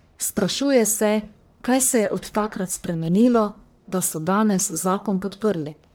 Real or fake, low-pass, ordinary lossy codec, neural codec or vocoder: fake; none; none; codec, 44.1 kHz, 1.7 kbps, Pupu-Codec